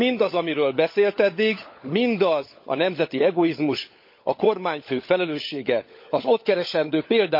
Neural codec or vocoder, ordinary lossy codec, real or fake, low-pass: codec, 16 kHz, 16 kbps, FunCodec, trained on LibriTTS, 50 frames a second; MP3, 32 kbps; fake; 5.4 kHz